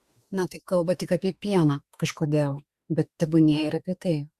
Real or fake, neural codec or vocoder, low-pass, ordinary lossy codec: fake; autoencoder, 48 kHz, 32 numbers a frame, DAC-VAE, trained on Japanese speech; 14.4 kHz; Opus, 64 kbps